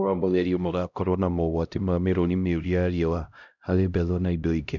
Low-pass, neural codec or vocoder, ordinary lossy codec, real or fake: 7.2 kHz; codec, 16 kHz, 0.5 kbps, X-Codec, HuBERT features, trained on LibriSpeech; none; fake